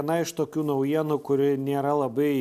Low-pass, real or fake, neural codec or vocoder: 14.4 kHz; real; none